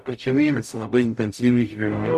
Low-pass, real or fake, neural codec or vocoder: 14.4 kHz; fake; codec, 44.1 kHz, 0.9 kbps, DAC